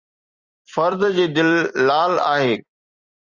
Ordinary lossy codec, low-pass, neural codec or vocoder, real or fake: Opus, 64 kbps; 7.2 kHz; vocoder, 44.1 kHz, 128 mel bands every 256 samples, BigVGAN v2; fake